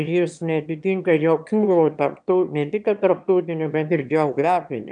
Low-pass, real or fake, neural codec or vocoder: 9.9 kHz; fake; autoencoder, 22.05 kHz, a latent of 192 numbers a frame, VITS, trained on one speaker